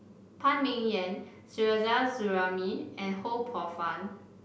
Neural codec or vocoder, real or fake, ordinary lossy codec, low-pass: none; real; none; none